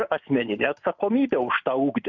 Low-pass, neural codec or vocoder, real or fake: 7.2 kHz; none; real